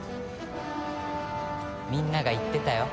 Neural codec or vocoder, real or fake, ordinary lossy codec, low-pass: none; real; none; none